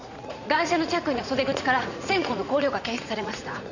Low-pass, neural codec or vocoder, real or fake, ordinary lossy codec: 7.2 kHz; vocoder, 44.1 kHz, 80 mel bands, Vocos; fake; none